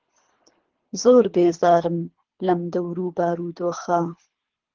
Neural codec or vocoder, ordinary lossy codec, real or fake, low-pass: codec, 24 kHz, 3 kbps, HILCodec; Opus, 16 kbps; fake; 7.2 kHz